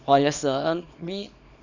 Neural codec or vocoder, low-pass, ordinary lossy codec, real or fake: codec, 24 kHz, 0.9 kbps, WavTokenizer, small release; 7.2 kHz; none; fake